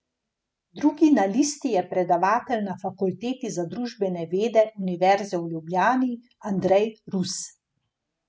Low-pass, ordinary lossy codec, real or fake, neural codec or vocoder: none; none; real; none